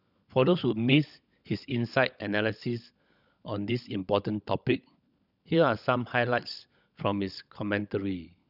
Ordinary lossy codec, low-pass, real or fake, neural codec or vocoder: none; 5.4 kHz; fake; codec, 16 kHz, 16 kbps, FunCodec, trained on LibriTTS, 50 frames a second